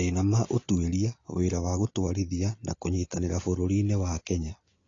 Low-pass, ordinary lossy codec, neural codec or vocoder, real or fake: 7.2 kHz; AAC, 32 kbps; none; real